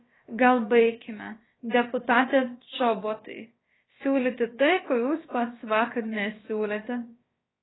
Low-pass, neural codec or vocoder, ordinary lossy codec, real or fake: 7.2 kHz; codec, 16 kHz, about 1 kbps, DyCAST, with the encoder's durations; AAC, 16 kbps; fake